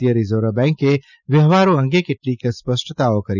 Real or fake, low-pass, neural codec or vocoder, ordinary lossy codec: real; 7.2 kHz; none; none